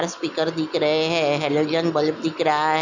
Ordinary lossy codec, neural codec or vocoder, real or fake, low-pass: none; none; real; 7.2 kHz